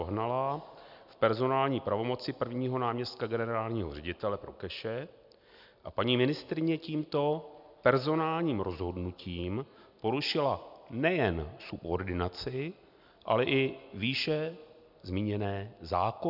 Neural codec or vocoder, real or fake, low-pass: none; real; 5.4 kHz